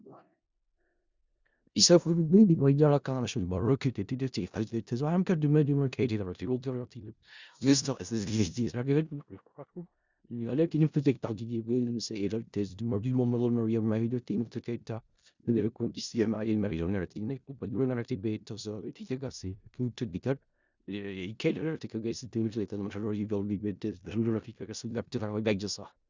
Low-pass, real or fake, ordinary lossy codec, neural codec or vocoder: 7.2 kHz; fake; Opus, 64 kbps; codec, 16 kHz in and 24 kHz out, 0.4 kbps, LongCat-Audio-Codec, four codebook decoder